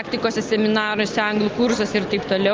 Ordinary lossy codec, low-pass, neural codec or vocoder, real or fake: Opus, 24 kbps; 7.2 kHz; none; real